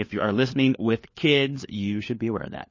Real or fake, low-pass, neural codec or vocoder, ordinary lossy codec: fake; 7.2 kHz; codec, 16 kHz, 4 kbps, FunCodec, trained on LibriTTS, 50 frames a second; MP3, 32 kbps